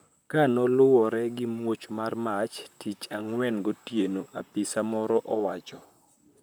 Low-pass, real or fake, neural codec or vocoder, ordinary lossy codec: none; fake; vocoder, 44.1 kHz, 128 mel bands, Pupu-Vocoder; none